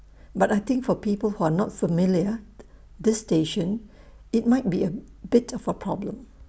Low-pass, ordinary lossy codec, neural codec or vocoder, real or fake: none; none; none; real